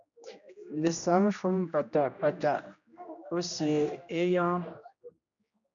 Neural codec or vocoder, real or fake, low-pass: codec, 16 kHz, 1 kbps, X-Codec, HuBERT features, trained on general audio; fake; 7.2 kHz